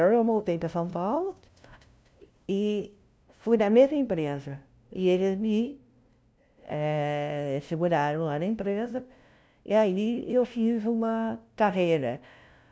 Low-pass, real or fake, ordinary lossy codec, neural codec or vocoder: none; fake; none; codec, 16 kHz, 0.5 kbps, FunCodec, trained on LibriTTS, 25 frames a second